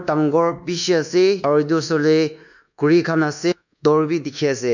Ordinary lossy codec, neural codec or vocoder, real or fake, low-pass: none; codec, 24 kHz, 1.2 kbps, DualCodec; fake; 7.2 kHz